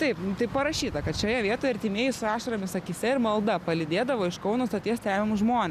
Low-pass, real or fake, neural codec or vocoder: 14.4 kHz; real; none